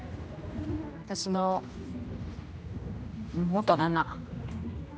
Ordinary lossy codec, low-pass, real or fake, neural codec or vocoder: none; none; fake; codec, 16 kHz, 1 kbps, X-Codec, HuBERT features, trained on general audio